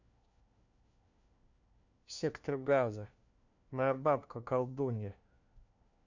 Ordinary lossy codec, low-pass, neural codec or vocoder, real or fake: none; 7.2 kHz; codec, 16 kHz, 1 kbps, FunCodec, trained on LibriTTS, 50 frames a second; fake